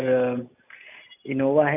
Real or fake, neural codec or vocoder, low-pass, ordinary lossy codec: real; none; 3.6 kHz; none